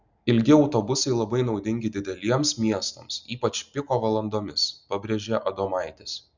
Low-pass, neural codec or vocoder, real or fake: 7.2 kHz; none; real